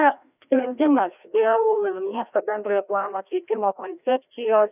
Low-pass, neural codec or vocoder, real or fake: 3.6 kHz; codec, 16 kHz, 1 kbps, FreqCodec, larger model; fake